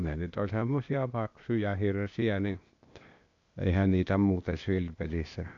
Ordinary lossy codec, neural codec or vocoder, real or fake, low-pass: AAC, 64 kbps; codec, 16 kHz, 0.8 kbps, ZipCodec; fake; 7.2 kHz